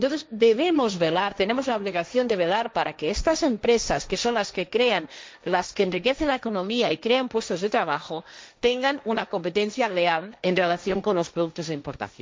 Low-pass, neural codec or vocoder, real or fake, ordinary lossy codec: none; codec, 16 kHz, 1.1 kbps, Voila-Tokenizer; fake; none